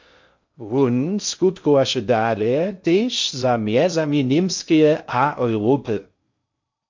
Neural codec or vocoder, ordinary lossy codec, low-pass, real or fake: codec, 16 kHz in and 24 kHz out, 0.6 kbps, FocalCodec, streaming, 2048 codes; MP3, 48 kbps; 7.2 kHz; fake